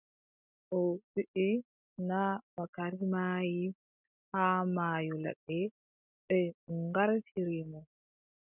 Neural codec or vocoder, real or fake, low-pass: none; real; 3.6 kHz